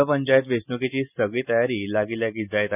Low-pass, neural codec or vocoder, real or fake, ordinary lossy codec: 3.6 kHz; none; real; none